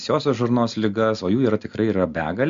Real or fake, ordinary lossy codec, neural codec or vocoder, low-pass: real; MP3, 64 kbps; none; 7.2 kHz